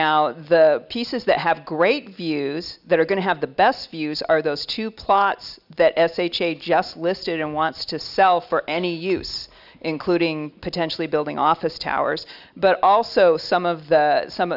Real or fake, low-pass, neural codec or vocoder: real; 5.4 kHz; none